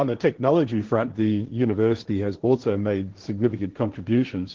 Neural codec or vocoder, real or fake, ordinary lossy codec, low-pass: codec, 16 kHz, 1.1 kbps, Voila-Tokenizer; fake; Opus, 16 kbps; 7.2 kHz